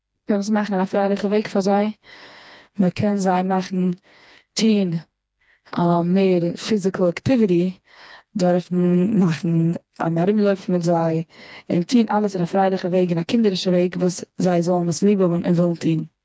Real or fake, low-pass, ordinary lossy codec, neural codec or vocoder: fake; none; none; codec, 16 kHz, 2 kbps, FreqCodec, smaller model